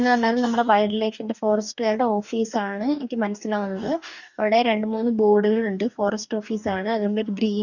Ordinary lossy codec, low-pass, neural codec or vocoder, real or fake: none; 7.2 kHz; codec, 44.1 kHz, 2.6 kbps, DAC; fake